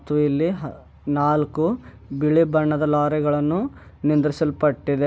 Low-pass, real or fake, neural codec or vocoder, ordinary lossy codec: none; real; none; none